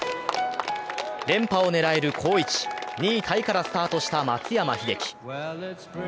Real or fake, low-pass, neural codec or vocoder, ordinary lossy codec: real; none; none; none